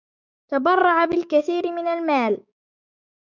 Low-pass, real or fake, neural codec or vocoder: 7.2 kHz; fake; codec, 16 kHz, 6 kbps, DAC